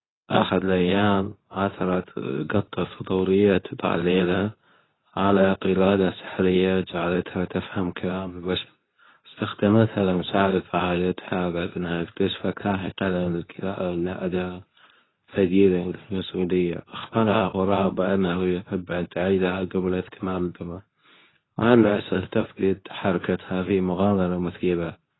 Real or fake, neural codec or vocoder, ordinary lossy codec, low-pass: fake; codec, 24 kHz, 0.9 kbps, WavTokenizer, medium speech release version 2; AAC, 16 kbps; 7.2 kHz